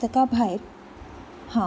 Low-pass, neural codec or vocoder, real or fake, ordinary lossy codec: none; none; real; none